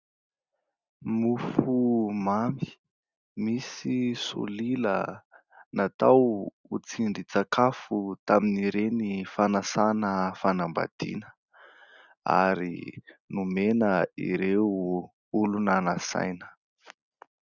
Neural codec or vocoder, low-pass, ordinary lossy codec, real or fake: none; 7.2 kHz; Opus, 64 kbps; real